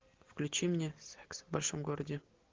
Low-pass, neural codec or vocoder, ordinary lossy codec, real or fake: 7.2 kHz; none; Opus, 16 kbps; real